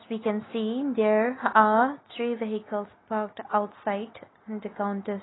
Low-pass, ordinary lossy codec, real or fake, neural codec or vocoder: 7.2 kHz; AAC, 16 kbps; real; none